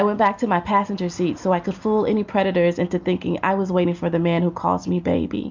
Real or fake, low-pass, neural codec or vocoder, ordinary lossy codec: real; 7.2 kHz; none; MP3, 64 kbps